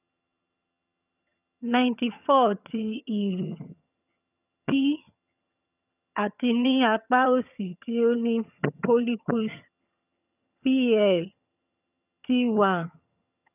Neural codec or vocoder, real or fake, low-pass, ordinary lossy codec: vocoder, 22.05 kHz, 80 mel bands, HiFi-GAN; fake; 3.6 kHz; none